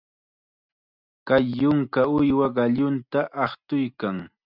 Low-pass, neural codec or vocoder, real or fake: 5.4 kHz; none; real